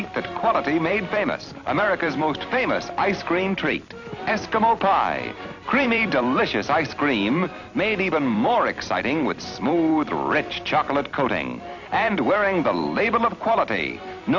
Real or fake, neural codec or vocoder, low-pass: fake; vocoder, 44.1 kHz, 128 mel bands every 256 samples, BigVGAN v2; 7.2 kHz